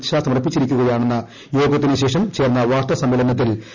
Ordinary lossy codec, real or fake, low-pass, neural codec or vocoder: none; real; 7.2 kHz; none